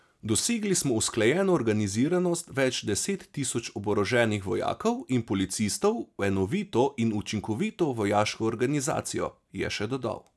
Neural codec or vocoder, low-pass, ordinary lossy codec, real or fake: none; none; none; real